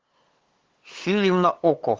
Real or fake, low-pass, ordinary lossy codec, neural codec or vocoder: fake; 7.2 kHz; Opus, 16 kbps; codec, 16 kHz, 8 kbps, FunCodec, trained on LibriTTS, 25 frames a second